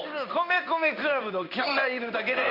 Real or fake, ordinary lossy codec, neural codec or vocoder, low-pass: fake; Opus, 64 kbps; codec, 16 kHz in and 24 kHz out, 1 kbps, XY-Tokenizer; 5.4 kHz